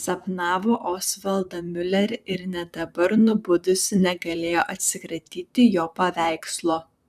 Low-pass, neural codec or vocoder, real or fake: 14.4 kHz; vocoder, 44.1 kHz, 128 mel bands, Pupu-Vocoder; fake